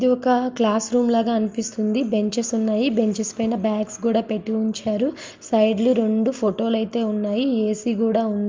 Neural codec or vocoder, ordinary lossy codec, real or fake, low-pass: none; Opus, 32 kbps; real; 7.2 kHz